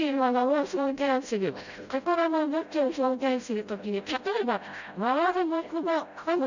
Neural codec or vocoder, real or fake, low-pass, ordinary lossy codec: codec, 16 kHz, 0.5 kbps, FreqCodec, smaller model; fake; 7.2 kHz; none